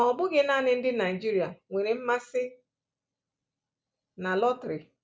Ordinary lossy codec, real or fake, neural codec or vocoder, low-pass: none; real; none; none